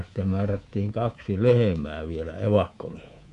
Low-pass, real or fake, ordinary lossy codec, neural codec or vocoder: 10.8 kHz; fake; none; codec, 24 kHz, 3.1 kbps, DualCodec